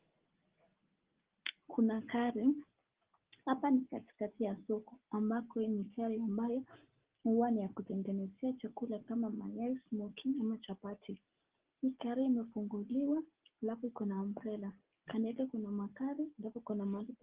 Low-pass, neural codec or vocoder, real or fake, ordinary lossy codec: 3.6 kHz; none; real; Opus, 16 kbps